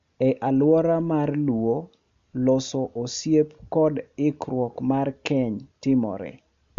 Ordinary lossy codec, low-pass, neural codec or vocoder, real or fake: MP3, 48 kbps; 7.2 kHz; none; real